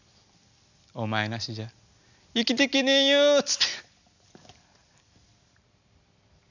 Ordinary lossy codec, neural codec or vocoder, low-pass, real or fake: none; none; 7.2 kHz; real